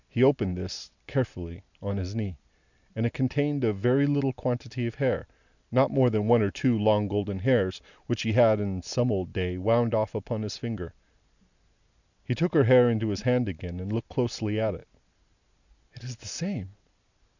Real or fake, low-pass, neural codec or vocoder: real; 7.2 kHz; none